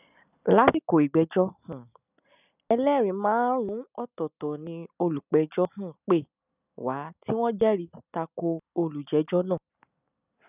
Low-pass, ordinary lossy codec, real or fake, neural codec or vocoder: 3.6 kHz; none; real; none